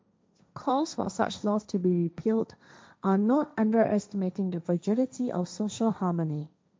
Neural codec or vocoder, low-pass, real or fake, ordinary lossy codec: codec, 16 kHz, 1.1 kbps, Voila-Tokenizer; none; fake; none